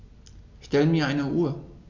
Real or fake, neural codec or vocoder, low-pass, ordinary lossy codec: real; none; 7.2 kHz; none